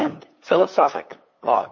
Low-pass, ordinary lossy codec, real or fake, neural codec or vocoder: 7.2 kHz; MP3, 32 kbps; fake; codec, 16 kHz, 8 kbps, FunCodec, trained on LibriTTS, 25 frames a second